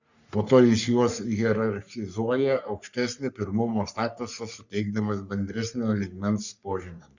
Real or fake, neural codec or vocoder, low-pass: fake; codec, 44.1 kHz, 3.4 kbps, Pupu-Codec; 7.2 kHz